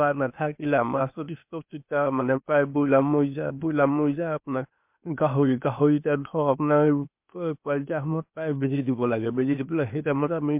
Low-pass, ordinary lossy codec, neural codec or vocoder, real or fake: 3.6 kHz; MP3, 32 kbps; codec, 16 kHz, 0.8 kbps, ZipCodec; fake